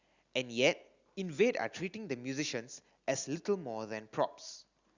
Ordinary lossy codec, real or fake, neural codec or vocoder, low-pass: Opus, 64 kbps; real; none; 7.2 kHz